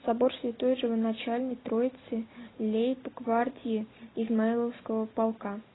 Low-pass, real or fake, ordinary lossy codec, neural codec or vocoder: 7.2 kHz; real; AAC, 16 kbps; none